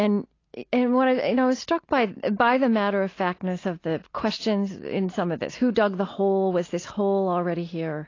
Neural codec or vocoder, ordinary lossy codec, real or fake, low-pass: none; AAC, 32 kbps; real; 7.2 kHz